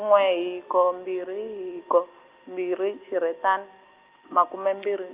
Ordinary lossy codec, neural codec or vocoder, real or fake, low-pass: Opus, 24 kbps; none; real; 3.6 kHz